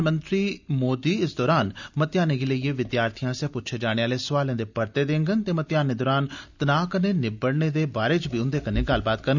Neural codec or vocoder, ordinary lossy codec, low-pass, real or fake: none; none; 7.2 kHz; real